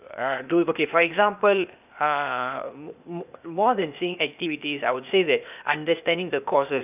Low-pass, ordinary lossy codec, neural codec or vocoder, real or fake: 3.6 kHz; none; codec, 16 kHz, 0.8 kbps, ZipCodec; fake